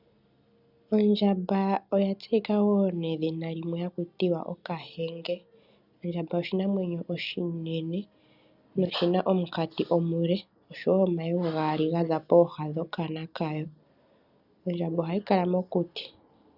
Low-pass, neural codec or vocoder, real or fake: 5.4 kHz; none; real